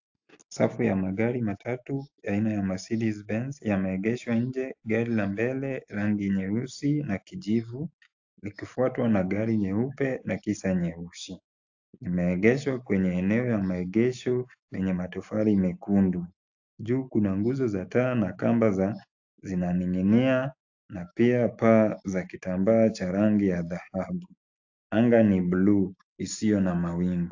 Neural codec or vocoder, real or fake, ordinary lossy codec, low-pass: none; real; AAC, 48 kbps; 7.2 kHz